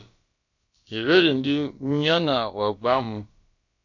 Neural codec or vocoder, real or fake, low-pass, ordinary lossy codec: codec, 16 kHz, about 1 kbps, DyCAST, with the encoder's durations; fake; 7.2 kHz; MP3, 48 kbps